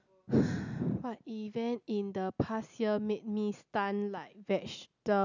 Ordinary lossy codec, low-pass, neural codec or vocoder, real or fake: none; 7.2 kHz; none; real